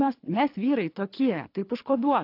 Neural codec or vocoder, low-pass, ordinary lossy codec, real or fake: codec, 24 kHz, 3 kbps, HILCodec; 5.4 kHz; AAC, 32 kbps; fake